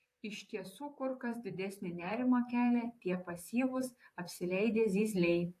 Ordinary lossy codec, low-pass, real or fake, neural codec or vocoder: AAC, 64 kbps; 14.4 kHz; fake; vocoder, 44.1 kHz, 128 mel bands, Pupu-Vocoder